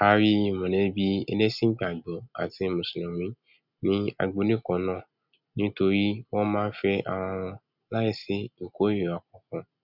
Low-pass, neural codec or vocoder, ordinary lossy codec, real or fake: 5.4 kHz; none; none; real